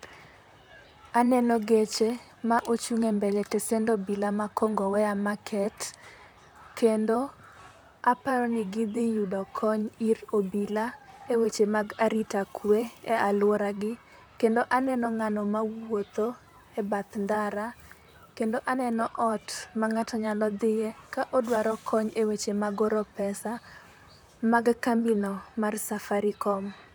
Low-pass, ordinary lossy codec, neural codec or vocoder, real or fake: none; none; vocoder, 44.1 kHz, 128 mel bands, Pupu-Vocoder; fake